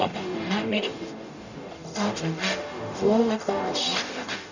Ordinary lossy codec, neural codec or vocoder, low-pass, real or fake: none; codec, 44.1 kHz, 0.9 kbps, DAC; 7.2 kHz; fake